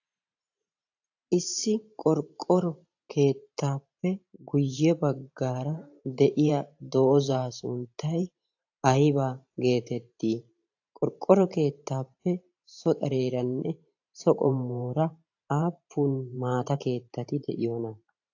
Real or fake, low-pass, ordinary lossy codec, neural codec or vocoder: fake; 7.2 kHz; AAC, 48 kbps; vocoder, 44.1 kHz, 128 mel bands every 512 samples, BigVGAN v2